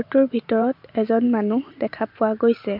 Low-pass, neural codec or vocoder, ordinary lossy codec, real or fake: 5.4 kHz; vocoder, 44.1 kHz, 128 mel bands every 512 samples, BigVGAN v2; MP3, 48 kbps; fake